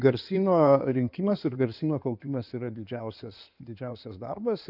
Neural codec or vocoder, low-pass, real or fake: codec, 16 kHz in and 24 kHz out, 2.2 kbps, FireRedTTS-2 codec; 5.4 kHz; fake